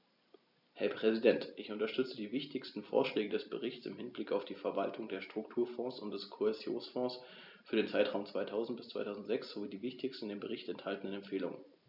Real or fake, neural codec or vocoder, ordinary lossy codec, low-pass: real; none; none; 5.4 kHz